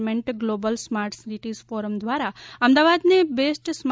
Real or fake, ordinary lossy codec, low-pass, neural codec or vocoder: real; none; none; none